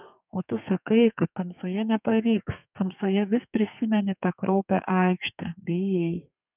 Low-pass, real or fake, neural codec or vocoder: 3.6 kHz; fake; codec, 32 kHz, 1.9 kbps, SNAC